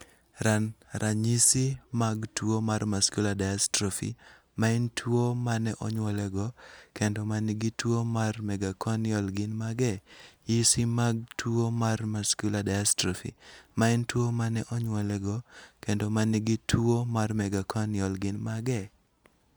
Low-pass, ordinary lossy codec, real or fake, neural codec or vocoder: none; none; real; none